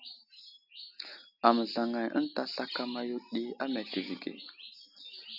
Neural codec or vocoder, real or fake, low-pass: none; real; 5.4 kHz